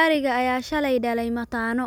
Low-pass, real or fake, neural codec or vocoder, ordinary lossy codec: none; real; none; none